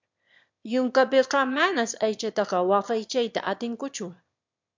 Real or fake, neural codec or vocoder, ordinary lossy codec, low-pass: fake; autoencoder, 22.05 kHz, a latent of 192 numbers a frame, VITS, trained on one speaker; MP3, 64 kbps; 7.2 kHz